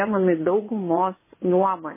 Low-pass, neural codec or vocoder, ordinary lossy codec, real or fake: 3.6 kHz; vocoder, 24 kHz, 100 mel bands, Vocos; MP3, 16 kbps; fake